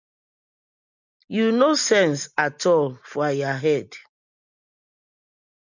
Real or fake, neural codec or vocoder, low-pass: real; none; 7.2 kHz